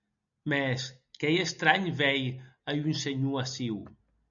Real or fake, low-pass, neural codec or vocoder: real; 7.2 kHz; none